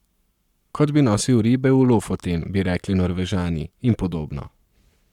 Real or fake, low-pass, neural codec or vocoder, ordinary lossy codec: fake; 19.8 kHz; codec, 44.1 kHz, 7.8 kbps, Pupu-Codec; none